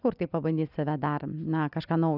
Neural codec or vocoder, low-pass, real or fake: none; 5.4 kHz; real